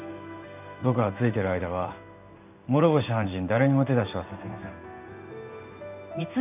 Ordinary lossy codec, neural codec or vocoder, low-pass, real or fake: none; codec, 16 kHz in and 24 kHz out, 1 kbps, XY-Tokenizer; 3.6 kHz; fake